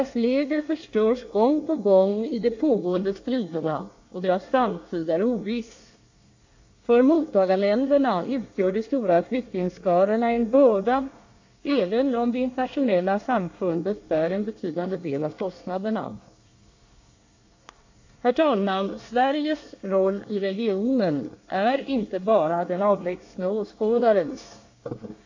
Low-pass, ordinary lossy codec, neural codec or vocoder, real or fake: 7.2 kHz; AAC, 48 kbps; codec, 24 kHz, 1 kbps, SNAC; fake